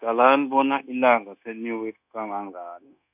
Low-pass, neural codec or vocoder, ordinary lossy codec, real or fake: 3.6 kHz; codec, 16 kHz, 0.9 kbps, LongCat-Audio-Codec; none; fake